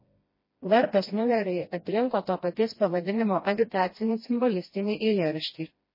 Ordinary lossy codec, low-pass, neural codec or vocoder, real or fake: MP3, 24 kbps; 5.4 kHz; codec, 16 kHz, 1 kbps, FreqCodec, smaller model; fake